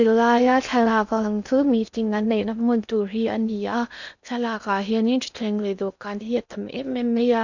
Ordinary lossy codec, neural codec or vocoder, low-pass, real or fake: none; codec, 16 kHz in and 24 kHz out, 0.8 kbps, FocalCodec, streaming, 65536 codes; 7.2 kHz; fake